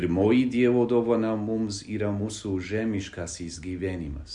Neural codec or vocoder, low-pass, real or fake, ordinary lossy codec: none; 10.8 kHz; real; Opus, 64 kbps